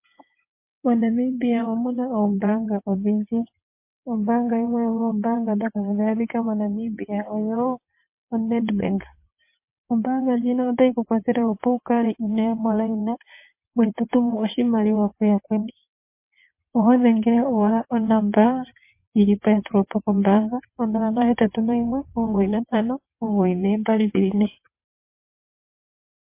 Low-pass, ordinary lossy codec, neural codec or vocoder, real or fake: 3.6 kHz; MP3, 24 kbps; vocoder, 22.05 kHz, 80 mel bands, WaveNeXt; fake